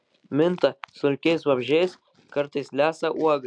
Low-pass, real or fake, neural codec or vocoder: 9.9 kHz; real; none